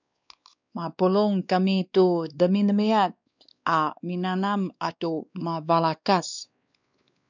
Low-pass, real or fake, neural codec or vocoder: 7.2 kHz; fake; codec, 16 kHz, 2 kbps, X-Codec, WavLM features, trained on Multilingual LibriSpeech